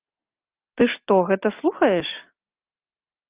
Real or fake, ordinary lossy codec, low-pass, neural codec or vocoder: real; Opus, 24 kbps; 3.6 kHz; none